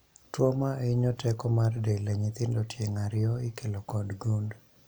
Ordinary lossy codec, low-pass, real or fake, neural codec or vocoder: none; none; real; none